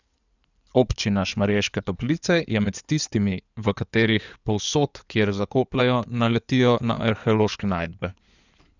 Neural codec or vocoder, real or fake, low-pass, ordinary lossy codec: codec, 16 kHz in and 24 kHz out, 2.2 kbps, FireRedTTS-2 codec; fake; 7.2 kHz; none